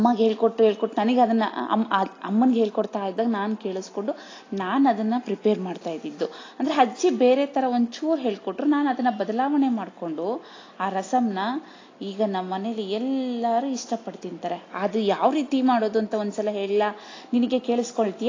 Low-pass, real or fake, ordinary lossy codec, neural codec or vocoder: 7.2 kHz; real; AAC, 32 kbps; none